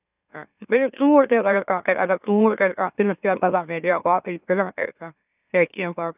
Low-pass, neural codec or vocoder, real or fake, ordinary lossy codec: 3.6 kHz; autoencoder, 44.1 kHz, a latent of 192 numbers a frame, MeloTTS; fake; none